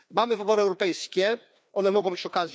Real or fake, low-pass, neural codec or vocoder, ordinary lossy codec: fake; none; codec, 16 kHz, 2 kbps, FreqCodec, larger model; none